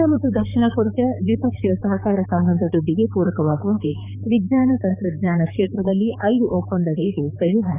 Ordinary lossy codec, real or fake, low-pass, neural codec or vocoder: none; fake; 3.6 kHz; codec, 16 kHz, 4 kbps, X-Codec, HuBERT features, trained on balanced general audio